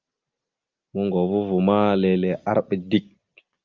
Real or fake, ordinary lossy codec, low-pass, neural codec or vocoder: real; Opus, 32 kbps; 7.2 kHz; none